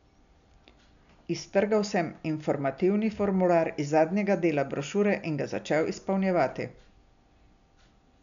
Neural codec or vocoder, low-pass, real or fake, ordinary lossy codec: none; 7.2 kHz; real; MP3, 96 kbps